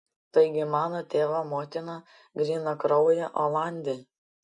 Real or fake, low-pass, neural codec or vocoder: real; 10.8 kHz; none